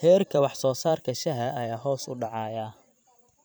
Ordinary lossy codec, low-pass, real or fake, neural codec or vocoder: none; none; fake; vocoder, 44.1 kHz, 128 mel bands every 512 samples, BigVGAN v2